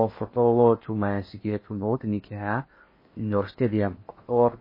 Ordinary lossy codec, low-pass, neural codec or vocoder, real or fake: MP3, 24 kbps; 5.4 kHz; codec, 16 kHz in and 24 kHz out, 0.8 kbps, FocalCodec, streaming, 65536 codes; fake